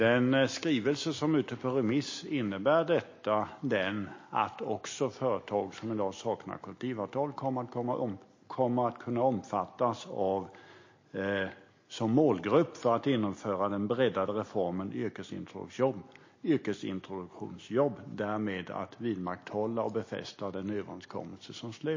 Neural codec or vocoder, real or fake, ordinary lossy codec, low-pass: none; real; MP3, 32 kbps; 7.2 kHz